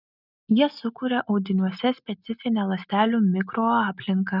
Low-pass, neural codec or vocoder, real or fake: 5.4 kHz; none; real